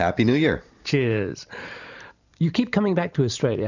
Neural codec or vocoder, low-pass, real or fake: vocoder, 44.1 kHz, 128 mel bands every 512 samples, BigVGAN v2; 7.2 kHz; fake